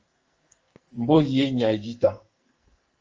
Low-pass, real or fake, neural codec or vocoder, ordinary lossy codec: 7.2 kHz; fake; codec, 44.1 kHz, 2.6 kbps, SNAC; Opus, 24 kbps